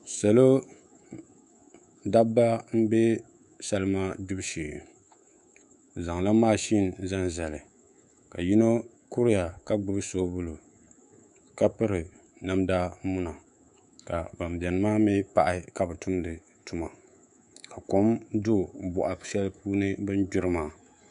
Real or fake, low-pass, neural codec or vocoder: fake; 10.8 kHz; codec, 24 kHz, 3.1 kbps, DualCodec